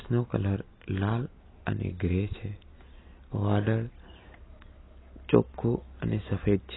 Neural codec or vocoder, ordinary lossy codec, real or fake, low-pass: none; AAC, 16 kbps; real; 7.2 kHz